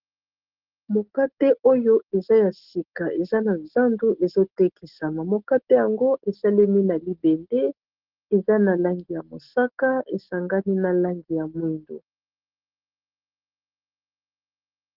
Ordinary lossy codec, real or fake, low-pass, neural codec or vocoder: Opus, 16 kbps; real; 5.4 kHz; none